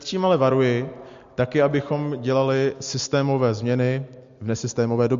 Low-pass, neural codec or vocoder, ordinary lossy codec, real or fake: 7.2 kHz; none; MP3, 48 kbps; real